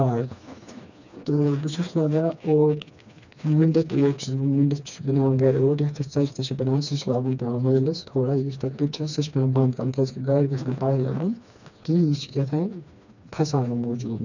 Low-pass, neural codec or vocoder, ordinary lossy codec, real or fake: 7.2 kHz; codec, 16 kHz, 2 kbps, FreqCodec, smaller model; none; fake